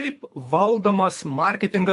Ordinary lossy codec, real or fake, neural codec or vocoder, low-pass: AAC, 64 kbps; fake; codec, 24 kHz, 3 kbps, HILCodec; 10.8 kHz